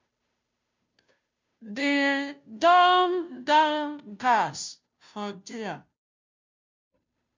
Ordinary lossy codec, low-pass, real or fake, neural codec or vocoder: MP3, 64 kbps; 7.2 kHz; fake; codec, 16 kHz, 0.5 kbps, FunCodec, trained on Chinese and English, 25 frames a second